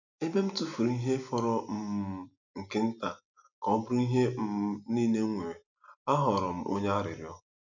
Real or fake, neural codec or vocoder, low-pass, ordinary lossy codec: real; none; 7.2 kHz; none